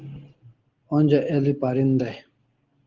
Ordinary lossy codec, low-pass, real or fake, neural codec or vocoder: Opus, 16 kbps; 7.2 kHz; real; none